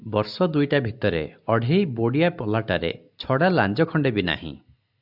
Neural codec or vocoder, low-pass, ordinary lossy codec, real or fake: none; 5.4 kHz; AAC, 48 kbps; real